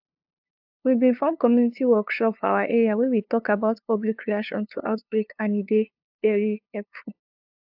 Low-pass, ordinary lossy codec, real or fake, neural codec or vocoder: 5.4 kHz; AAC, 48 kbps; fake; codec, 16 kHz, 2 kbps, FunCodec, trained on LibriTTS, 25 frames a second